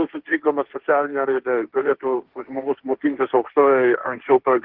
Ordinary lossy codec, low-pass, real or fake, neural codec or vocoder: Opus, 16 kbps; 5.4 kHz; fake; codec, 16 kHz, 1.1 kbps, Voila-Tokenizer